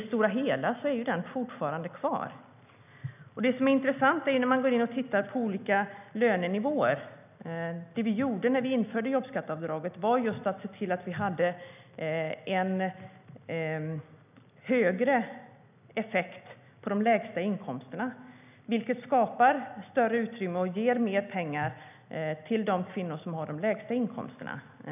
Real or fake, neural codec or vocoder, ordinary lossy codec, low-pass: real; none; none; 3.6 kHz